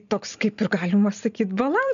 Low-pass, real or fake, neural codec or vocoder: 7.2 kHz; real; none